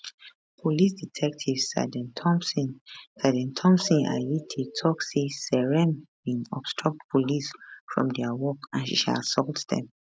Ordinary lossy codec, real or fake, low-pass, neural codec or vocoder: none; real; none; none